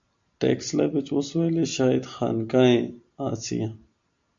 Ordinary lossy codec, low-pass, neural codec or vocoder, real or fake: MP3, 64 kbps; 7.2 kHz; none; real